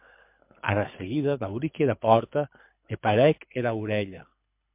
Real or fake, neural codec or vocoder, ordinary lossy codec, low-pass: fake; codec, 24 kHz, 6 kbps, HILCodec; MP3, 32 kbps; 3.6 kHz